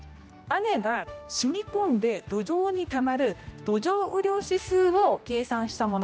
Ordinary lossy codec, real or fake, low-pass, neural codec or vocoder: none; fake; none; codec, 16 kHz, 1 kbps, X-Codec, HuBERT features, trained on general audio